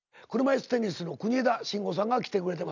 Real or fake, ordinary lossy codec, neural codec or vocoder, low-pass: real; none; none; 7.2 kHz